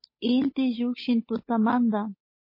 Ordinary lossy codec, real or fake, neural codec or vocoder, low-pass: MP3, 24 kbps; fake; codec, 16 kHz, 8 kbps, FunCodec, trained on Chinese and English, 25 frames a second; 5.4 kHz